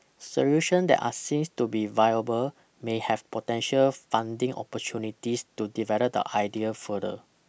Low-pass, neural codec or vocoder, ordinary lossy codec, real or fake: none; none; none; real